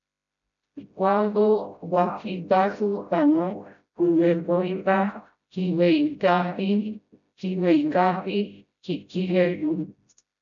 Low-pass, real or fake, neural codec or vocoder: 7.2 kHz; fake; codec, 16 kHz, 0.5 kbps, FreqCodec, smaller model